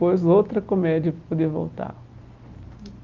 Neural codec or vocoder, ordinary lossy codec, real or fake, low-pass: none; Opus, 24 kbps; real; 7.2 kHz